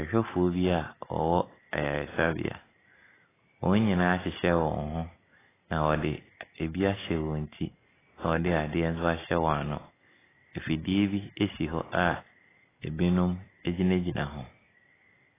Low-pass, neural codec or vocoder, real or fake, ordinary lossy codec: 3.6 kHz; none; real; AAC, 16 kbps